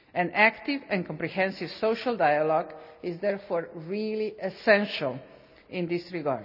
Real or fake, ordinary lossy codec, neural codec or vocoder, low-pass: real; none; none; 5.4 kHz